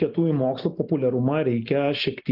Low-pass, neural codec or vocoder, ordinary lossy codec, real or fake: 5.4 kHz; none; Opus, 24 kbps; real